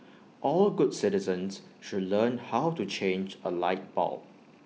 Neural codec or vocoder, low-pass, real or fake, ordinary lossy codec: none; none; real; none